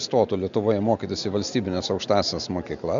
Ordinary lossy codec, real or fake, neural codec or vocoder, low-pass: MP3, 64 kbps; real; none; 7.2 kHz